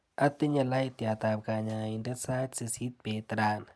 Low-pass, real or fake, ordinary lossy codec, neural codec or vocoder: none; real; none; none